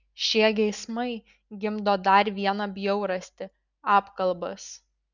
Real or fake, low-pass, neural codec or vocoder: real; 7.2 kHz; none